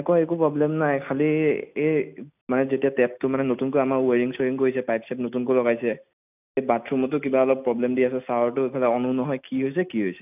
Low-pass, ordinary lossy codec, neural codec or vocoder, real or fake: 3.6 kHz; none; none; real